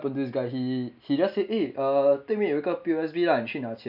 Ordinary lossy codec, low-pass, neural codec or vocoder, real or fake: none; 5.4 kHz; none; real